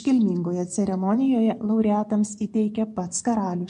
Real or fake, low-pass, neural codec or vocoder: fake; 10.8 kHz; vocoder, 24 kHz, 100 mel bands, Vocos